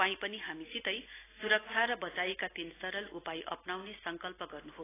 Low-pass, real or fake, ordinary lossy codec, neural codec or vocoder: 3.6 kHz; real; AAC, 16 kbps; none